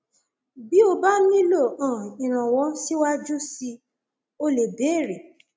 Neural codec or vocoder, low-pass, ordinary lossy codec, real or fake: none; none; none; real